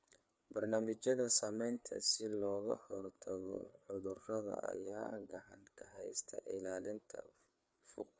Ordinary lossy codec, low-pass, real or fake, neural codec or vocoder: none; none; fake; codec, 16 kHz, 4 kbps, FreqCodec, larger model